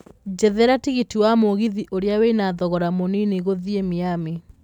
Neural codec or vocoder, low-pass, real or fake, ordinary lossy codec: none; 19.8 kHz; real; none